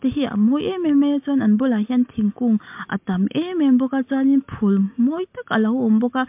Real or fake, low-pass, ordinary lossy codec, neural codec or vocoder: real; 3.6 kHz; MP3, 32 kbps; none